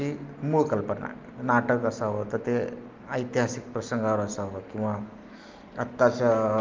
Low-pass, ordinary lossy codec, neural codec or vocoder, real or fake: 7.2 kHz; Opus, 24 kbps; none; real